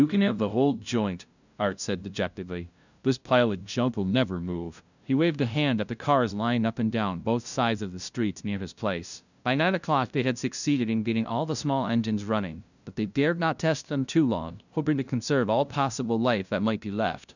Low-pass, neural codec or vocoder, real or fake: 7.2 kHz; codec, 16 kHz, 0.5 kbps, FunCodec, trained on LibriTTS, 25 frames a second; fake